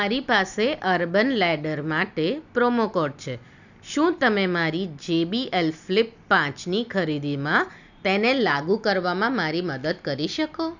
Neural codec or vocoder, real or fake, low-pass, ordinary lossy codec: none; real; 7.2 kHz; none